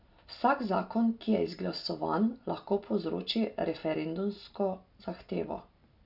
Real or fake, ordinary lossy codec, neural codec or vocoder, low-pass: real; none; none; 5.4 kHz